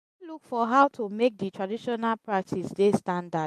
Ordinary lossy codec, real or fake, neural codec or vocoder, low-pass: MP3, 64 kbps; real; none; 14.4 kHz